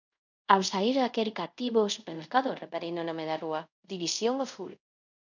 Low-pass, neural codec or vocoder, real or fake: 7.2 kHz; codec, 24 kHz, 0.5 kbps, DualCodec; fake